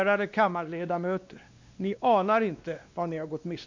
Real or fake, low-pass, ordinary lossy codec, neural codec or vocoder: fake; 7.2 kHz; MP3, 64 kbps; codec, 16 kHz, 2 kbps, X-Codec, WavLM features, trained on Multilingual LibriSpeech